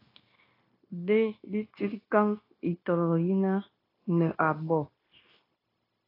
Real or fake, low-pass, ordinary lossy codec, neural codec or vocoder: fake; 5.4 kHz; AAC, 24 kbps; codec, 16 kHz, 0.9 kbps, LongCat-Audio-Codec